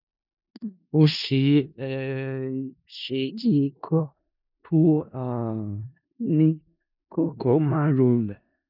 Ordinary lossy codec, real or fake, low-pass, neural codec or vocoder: none; fake; 5.4 kHz; codec, 16 kHz in and 24 kHz out, 0.4 kbps, LongCat-Audio-Codec, four codebook decoder